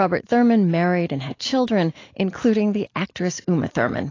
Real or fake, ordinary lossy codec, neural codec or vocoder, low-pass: real; AAC, 32 kbps; none; 7.2 kHz